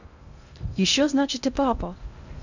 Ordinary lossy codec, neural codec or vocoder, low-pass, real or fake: none; codec, 16 kHz in and 24 kHz out, 0.6 kbps, FocalCodec, streaming, 2048 codes; 7.2 kHz; fake